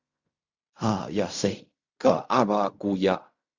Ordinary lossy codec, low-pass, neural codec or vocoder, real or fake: Opus, 64 kbps; 7.2 kHz; codec, 16 kHz in and 24 kHz out, 0.4 kbps, LongCat-Audio-Codec, fine tuned four codebook decoder; fake